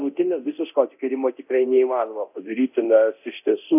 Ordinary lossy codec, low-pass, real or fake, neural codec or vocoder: AAC, 32 kbps; 3.6 kHz; fake; codec, 24 kHz, 0.9 kbps, DualCodec